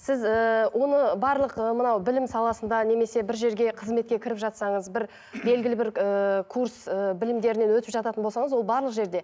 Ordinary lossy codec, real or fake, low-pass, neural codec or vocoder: none; real; none; none